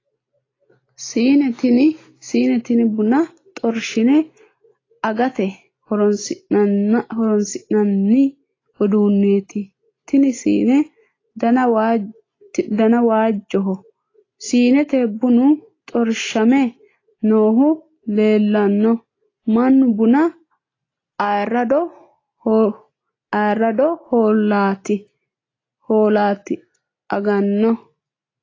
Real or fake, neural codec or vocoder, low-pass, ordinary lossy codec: real; none; 7.2 kHz; AAC, 32 kbps